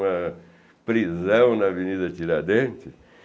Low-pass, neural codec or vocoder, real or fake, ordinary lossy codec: none; none; real; none